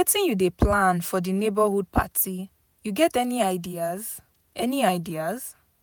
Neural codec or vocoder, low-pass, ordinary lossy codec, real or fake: vocoder, 48 kHz, 128 mel bands, Vocos; none; none; fake